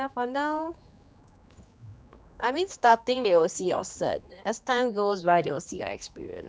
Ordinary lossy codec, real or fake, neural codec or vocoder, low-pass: none; fake; codec, 16 kHz, 2 kbps, X-Codec, HuBERT features, trained on general audio; none